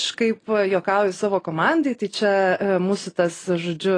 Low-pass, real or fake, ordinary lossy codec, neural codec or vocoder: 9.9 kHz; real; AAC, 32 kbps; none